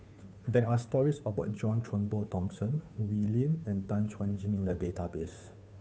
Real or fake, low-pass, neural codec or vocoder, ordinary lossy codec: fake; none; codec, 16 kHz, 2 kbps, FunCodec, trained on Chinese and English, 25 frames a second; none